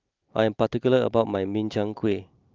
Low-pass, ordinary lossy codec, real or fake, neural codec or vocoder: 7.2 kHz; Opus, 24 kbps; real; none